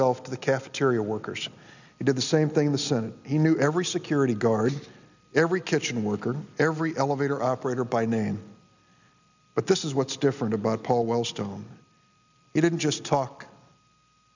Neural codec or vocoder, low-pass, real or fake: none; 7.2 kHz; real